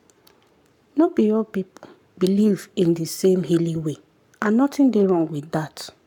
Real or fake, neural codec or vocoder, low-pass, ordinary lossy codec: fake; codec, 44.1 kHz, 7.8 kbps, Pupu-Codec; 19.8 kHz; none